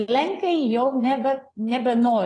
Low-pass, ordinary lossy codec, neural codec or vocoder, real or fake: 9.9 kHz; AAC, 32 kbps; vocoder, 22.05 kHz, 80 mel bands, WaveNeXt; fake